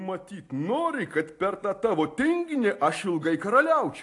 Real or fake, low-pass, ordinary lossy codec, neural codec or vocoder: real; 10.8 kHz; AAC, 48 kbps; none